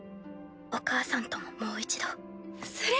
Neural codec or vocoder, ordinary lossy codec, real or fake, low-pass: none; none; real; none